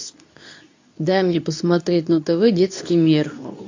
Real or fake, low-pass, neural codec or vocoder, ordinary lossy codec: fake; 7.2 kHz; codec, 24 kHz, 0.9 kbps, WavTokenizer, medium speech release version 2; AAC, 48 kbps